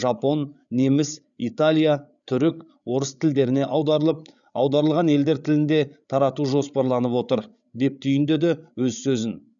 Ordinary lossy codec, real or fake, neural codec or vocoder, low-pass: none; fake; codec, 16 kHz, 8 kbps, FreqCodec, larger model; 7.2 kHz